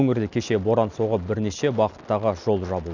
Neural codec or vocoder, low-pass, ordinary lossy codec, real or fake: none; 7.2 kHz; none; real